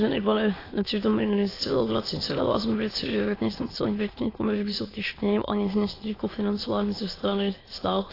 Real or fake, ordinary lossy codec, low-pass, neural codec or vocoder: fake; AAC, 24 kbps; 5.4 kHz; autoencoder, 22.05 kHz, a latent of 192 numbers a frame, VITS, trained on many speakers